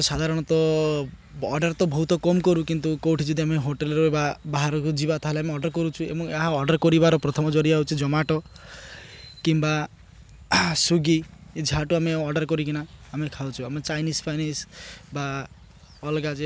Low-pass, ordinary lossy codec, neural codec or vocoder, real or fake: none; none; none; real